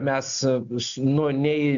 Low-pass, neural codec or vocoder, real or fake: 7.2 kHz; none; real